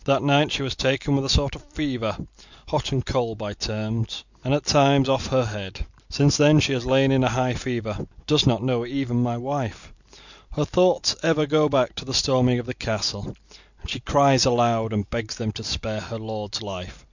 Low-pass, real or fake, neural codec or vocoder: 7.2 kHz; real; none